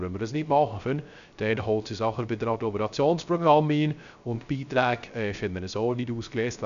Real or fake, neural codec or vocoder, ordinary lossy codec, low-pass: fake; codec, 16 kHz, 0.3 kbps, FocalCodec; none; 7.2 kHz